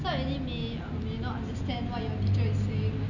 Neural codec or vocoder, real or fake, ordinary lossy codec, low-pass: none; real; none; 7.2 kHz